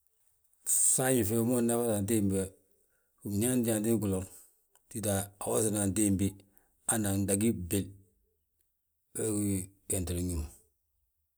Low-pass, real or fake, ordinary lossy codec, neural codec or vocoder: none; real; none; none